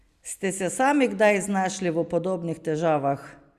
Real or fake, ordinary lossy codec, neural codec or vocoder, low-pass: real; Opus, 64 kbps; none; 14.4 kHz